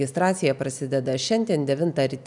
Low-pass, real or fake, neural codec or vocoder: 10.8 kHz; real; none